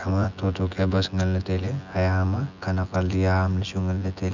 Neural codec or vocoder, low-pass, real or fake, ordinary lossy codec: vocoder, 24 kHz, 100 mel bands, Vocos; 7.2 kHz; fake; none